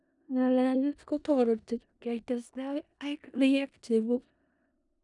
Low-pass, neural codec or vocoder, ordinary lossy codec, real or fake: 10.8 kHz; codec, 16 kHz in and 24 kHz out, 0.4 kbps, LongCat-Audio-Codec, four codebook decoder; none; fake